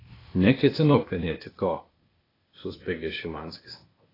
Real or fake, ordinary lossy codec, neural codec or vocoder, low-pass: fake; AAC, 24 kbps; codec, 16 kHz, 0.8 kbps, ZipCodec; 5.4 kHz